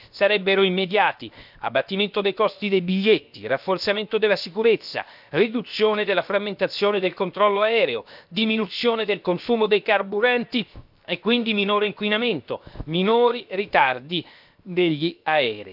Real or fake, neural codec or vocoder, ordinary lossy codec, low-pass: fake; codec, 16 kHz, 0.7 kbps, FocalCodec; none; 5.4 kHz